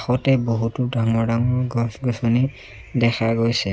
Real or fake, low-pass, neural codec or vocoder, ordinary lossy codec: real; none; none; none